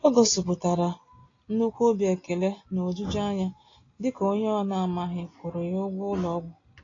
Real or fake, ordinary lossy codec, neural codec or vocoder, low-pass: real; AAC, 32 kbps; none; 7.2 kHz